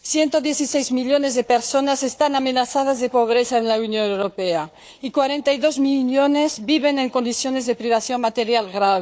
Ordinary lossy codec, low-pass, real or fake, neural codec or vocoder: none; none; fake; codec, 16 kHz, 4 kbps, FunCodec, trained on Chinese and English, 50 frames a second